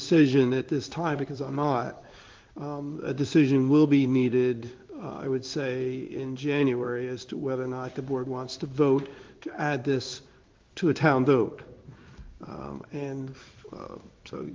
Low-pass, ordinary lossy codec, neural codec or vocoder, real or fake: 7.2 kHz; Opus, 32 kbps; codec, 16 kHz in and 24 kHz out, 1 kbps, XY-Tokenizer; fake